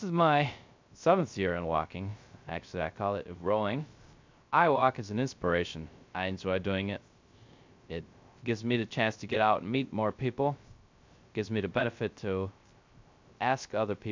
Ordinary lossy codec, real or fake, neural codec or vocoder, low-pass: MP3, 64 kbps; fake; codec, 16 kHz, 0.3 kbps, FocalCodec; 7.2 kHz